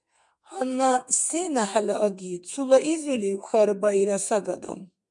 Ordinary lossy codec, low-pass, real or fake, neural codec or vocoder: MP3, 96 kbps; 10.8 kHz; fake; codec, 32 kHz, 1.9 kbps, SNAC